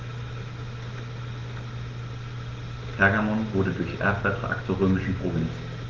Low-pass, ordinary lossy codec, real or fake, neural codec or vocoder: 7.2 kHz; Opus, 16 kbps; real; none